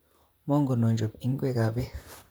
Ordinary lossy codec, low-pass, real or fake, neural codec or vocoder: none; none; fake; vocoder, 44.1 kHz, 128 mel bands, Pupu-Vocoder